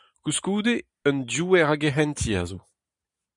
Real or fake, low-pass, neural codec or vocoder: fake; 10.8 kHz; vocoder, 44.1 kHz, 128 mel bands every 512 samples, BigVGAN v2